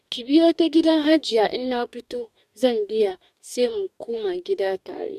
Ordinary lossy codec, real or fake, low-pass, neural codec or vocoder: none; fake; 14.4 kHz; codec, 44.1 kHz, 2.6 kbps, DAC